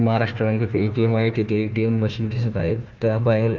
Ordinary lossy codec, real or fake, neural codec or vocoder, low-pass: Opus, 24 kbps; fake; codec, 16 kHz, 1 kbps, FunCodec, trained on Chinese and English, 50 frames a second; 7.2 kHz